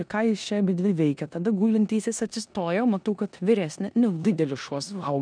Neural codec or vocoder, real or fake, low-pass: codec, 16 kHz in and 24 kHz out, 0.9 kbps, LongCat-Audio-Codec, four codebook decoder; fake; 9.9 kHz